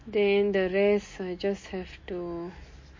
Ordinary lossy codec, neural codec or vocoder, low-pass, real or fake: MP3, 32 kbps; none; 7.2 kHz; real